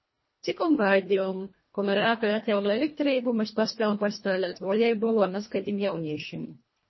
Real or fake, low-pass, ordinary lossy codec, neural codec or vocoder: fake; 7.2 kHz; MP3, 24 kbps; codec, 24 kHz, 1.5 kbps, HILCodec